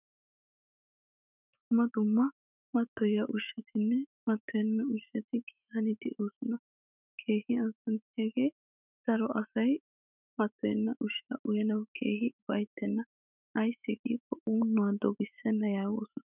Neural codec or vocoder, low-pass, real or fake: autoencoder, 48 kHz, 128 numbers a frame, DAC-VAE, trained on Japanese speech; 3.6 kHz; fake